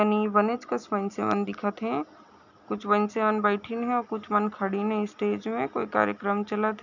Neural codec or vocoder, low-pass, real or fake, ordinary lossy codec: none; 7.2 kHz; real; none